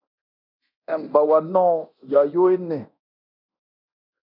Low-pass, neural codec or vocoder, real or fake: 5.4 kHz; codec, 24 kHz, 0.9 kbps, DualCodec; fake